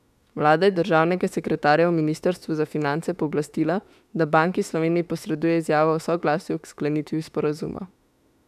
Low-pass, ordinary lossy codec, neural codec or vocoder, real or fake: 14.4 kHz; none; autoencoder, 48 kHz, 32 numbers a frame, DAC-VAE, trained on Japanese speech; fake